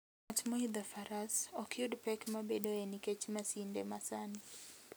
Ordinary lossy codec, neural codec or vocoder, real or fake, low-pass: none; none; real; none